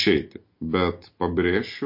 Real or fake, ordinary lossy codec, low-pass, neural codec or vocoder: real; MP3, 32 kbps; 5.4 kHz; none